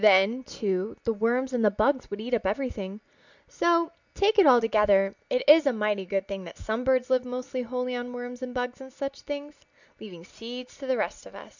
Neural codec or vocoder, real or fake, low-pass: none; real; 7.2 kHz